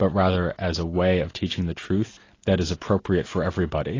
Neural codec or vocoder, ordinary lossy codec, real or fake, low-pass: none; AAC, 32 kbps; real; 7.2 kHz